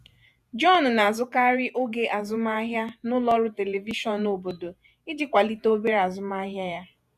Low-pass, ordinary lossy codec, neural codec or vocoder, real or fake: 14.4 kHz; none; vocoder, 48 kHz, 128 mel bands, Vocos; fake